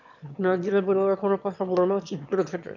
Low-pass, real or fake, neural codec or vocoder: 7.2 kHz; fake; autoencoder, 22.05 kHz, a latent of 192 numbers a frame, VITS, trained on one speaker